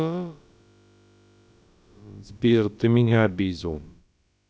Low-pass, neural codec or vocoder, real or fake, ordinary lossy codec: none; codec, 16 kHz, about 1 kbps, DyCAST, with the encoder's durations; fake; none